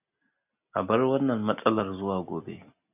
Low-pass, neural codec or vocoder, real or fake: 3.6 kHz; none; real